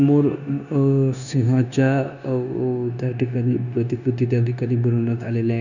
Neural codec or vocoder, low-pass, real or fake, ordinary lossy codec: codec, 16 kHz, 0.9 kbps, LongCat-Audio-Codec; 7.2 kHz; fake; none